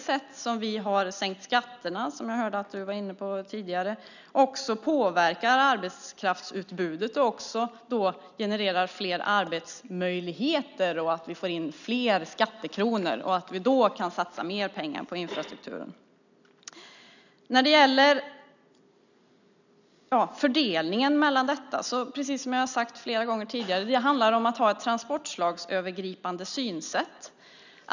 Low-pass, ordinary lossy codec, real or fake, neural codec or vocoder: 7.2 kHz; none; real; none